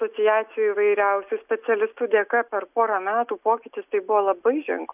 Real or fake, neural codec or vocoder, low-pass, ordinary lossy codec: real; none; 3.6 kHz; AAC, 32 kbps